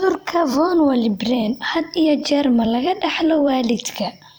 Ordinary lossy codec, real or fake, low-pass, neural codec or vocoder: none; fake; none; vocoder, 44.1 kHz, 128 mel bands every 256 samples, BigVGAN v2